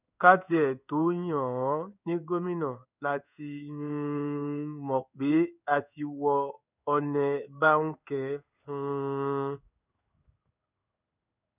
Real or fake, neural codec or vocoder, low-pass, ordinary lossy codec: fake; codec, 16 kHz in and 24 kHz out, 1 kbps, XY-Tokenizer; 3.6 kHz; none